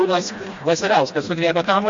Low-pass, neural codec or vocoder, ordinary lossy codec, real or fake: 7.2 kHz; codec, 16 kHz, 1 kbps, FreqCodec, smaller model; MP3, 48 kbps; fake